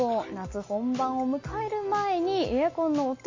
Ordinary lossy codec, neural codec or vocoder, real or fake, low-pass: MP3, 32 kbps; none; real; 7.2 kHz